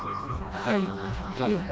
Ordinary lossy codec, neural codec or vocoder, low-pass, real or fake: none; codec, 16 kHz, 1 kbps, FreqCodec, smaller model; none; fake